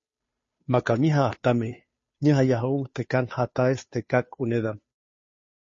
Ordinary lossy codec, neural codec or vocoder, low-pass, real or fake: MP3, 32 kbps; codec, 16 kHz, 2 kbps, FunCodec, trained on Chinese and English, 25 frames a second; 7.2 kHz; fake